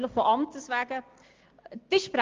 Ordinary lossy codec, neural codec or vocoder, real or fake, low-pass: Opus, 32 kbps; none; real; 7.2 kHz